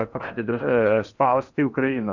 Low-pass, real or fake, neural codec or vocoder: 7.2 kHz; fake; codec, 16 kHz in and 24 kHz out, 0.8 kbps, FocalCodec, streaming, 65536 codes